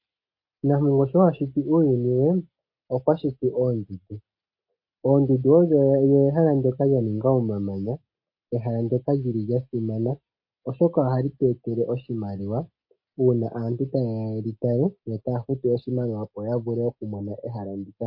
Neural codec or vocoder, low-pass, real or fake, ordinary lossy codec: none; 5.4 kHz; real; MP3, 32 kbps